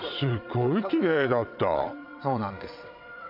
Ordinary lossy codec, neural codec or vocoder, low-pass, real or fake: none; vocoder, 22.05 kHz, 80 mel bands, WaveNeXt; 5.4 kHz; fake